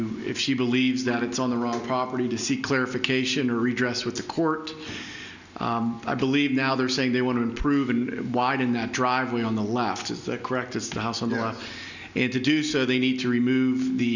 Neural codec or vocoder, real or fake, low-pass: none; real; 7.2 kHz